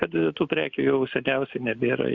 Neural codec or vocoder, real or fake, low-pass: none; real; 7.2 kHz